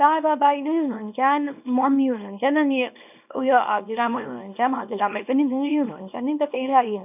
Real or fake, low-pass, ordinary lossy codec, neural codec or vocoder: fake; 3.6 kHz; none; codec, 24 kHz, 0.9 kbps, WavTokenizer, small release